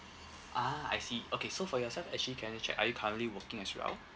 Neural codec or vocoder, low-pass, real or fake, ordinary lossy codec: none; none; real; none